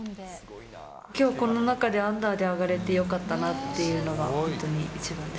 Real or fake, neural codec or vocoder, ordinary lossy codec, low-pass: real; none; none; none